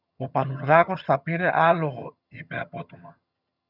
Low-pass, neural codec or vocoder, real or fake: 5.4 kHz; vocoder, 22.05 kHz, 80 mel bands, HiFi-GAN; fake